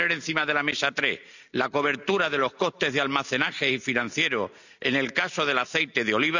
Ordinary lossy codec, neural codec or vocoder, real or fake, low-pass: none; none; real; 7.2 kHz